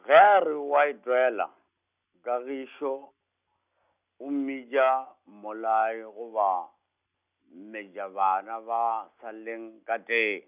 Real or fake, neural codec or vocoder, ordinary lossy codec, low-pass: real; none; none; 3.6 kHz